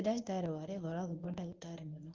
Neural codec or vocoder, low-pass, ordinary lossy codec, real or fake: codec, 24 kHz, 0.9 kbps, WavTokenizer, medium speech release version 1; 7.2 kHz; Opus, 32 kbps; fake